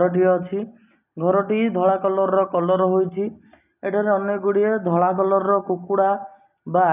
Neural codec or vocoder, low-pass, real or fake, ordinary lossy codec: none; 3.6 kHz; real; none